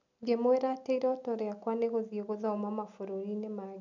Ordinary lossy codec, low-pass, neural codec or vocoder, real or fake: none; 7.2 kHz; none; real